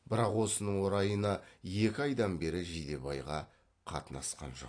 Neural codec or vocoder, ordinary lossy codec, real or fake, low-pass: none; AAC, 48 kbps; real; 9.9 kHz